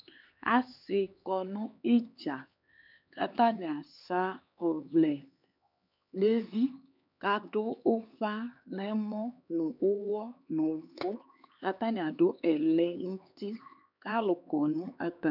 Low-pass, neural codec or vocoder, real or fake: 5.4 kHz; codec, 16 kHz, 4 kbps, X-Codec, HuBERT features, trained on LibriSpeech; fake